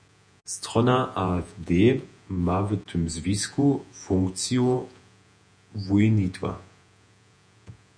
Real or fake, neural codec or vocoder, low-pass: fake; vocoder, 48 kHz, 128 mel bands, Vocos; 9.9 kHz